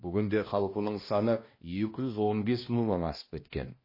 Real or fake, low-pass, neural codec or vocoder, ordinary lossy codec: fake; 5.4 kHz; codec, 16 kHz, 1 kbps, X-Codec, HuBERT features, trained on balanced general audio; MP3, 24 kbps